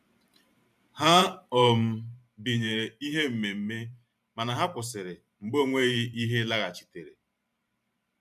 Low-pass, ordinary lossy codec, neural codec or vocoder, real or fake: 14.4 kHz; none; vocoder, 44.1 kHz, 128 mel bands every 512 samples, BigVGAN v2; fake